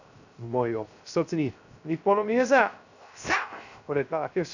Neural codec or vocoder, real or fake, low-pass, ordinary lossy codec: codec, 16 kHz, 0.3 kbps, FocalCodec; fake; 7.2 kHz; none